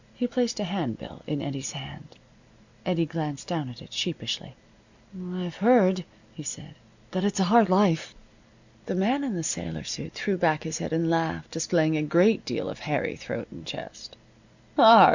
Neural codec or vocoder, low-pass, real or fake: none; 7.2 kHz; real